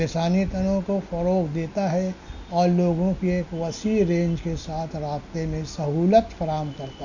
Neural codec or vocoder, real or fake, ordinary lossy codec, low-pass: none; real; none; 7.2 kHz